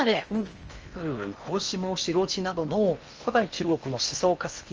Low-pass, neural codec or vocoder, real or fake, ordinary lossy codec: 7.2 kHz; codec, 16 kHz in and 24 kHz out, 0.6 kbps, FocalCodec, streaming, 4096 codes; fake; Opus, 24 kbps